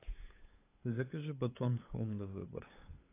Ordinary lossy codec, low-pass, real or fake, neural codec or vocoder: AAC, 16 kbps; 3.6 kHz; fake; codec, 16 kHz, 8 kbps, FunCodec, trained on LibriTTS, 25 frames a second